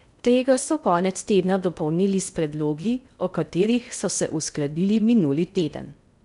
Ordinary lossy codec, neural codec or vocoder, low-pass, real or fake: none; codec, 16 kHz in and 24 kHz out, 0.6 kbps, FocalCodec, streaming, 2048 codes; 10.8 kHz; fake